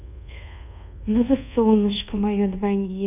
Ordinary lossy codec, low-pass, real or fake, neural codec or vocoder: none; 3.6 kHz; fake; codec, 24 kHz, 1.2 kbps, DualCodec